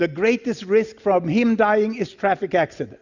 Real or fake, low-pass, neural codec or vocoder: real; 7.2 kHz; none